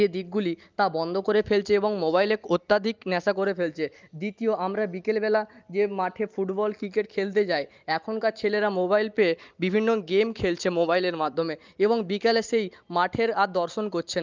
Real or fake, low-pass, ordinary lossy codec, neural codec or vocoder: real; 7.2 kHz; Opus, 24 kbps; none